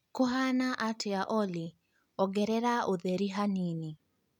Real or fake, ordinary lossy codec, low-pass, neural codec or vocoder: real; none; 19.8 kHz; none